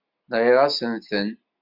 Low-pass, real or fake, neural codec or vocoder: 5.4 kHz; real; none